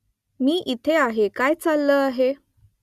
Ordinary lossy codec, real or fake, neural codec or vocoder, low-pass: Opus, 64 kbps; real; none; 14.4 kHz